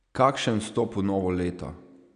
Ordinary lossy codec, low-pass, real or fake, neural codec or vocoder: none; 9.9 kHz; real; none